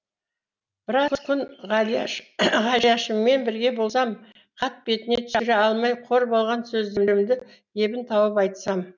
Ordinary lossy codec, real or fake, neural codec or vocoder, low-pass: none; real; none; 7.2 kHz